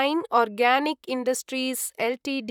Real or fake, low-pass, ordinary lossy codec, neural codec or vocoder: fake; 19.8 kHz; none; vocoder, 44.1 kHz, 128 mel bands, Pupu-Vocoder